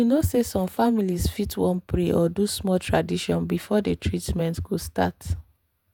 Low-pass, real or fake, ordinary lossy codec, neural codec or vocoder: none; fake; none; vocoder, 48 kHz, 128 mel bands, Vocos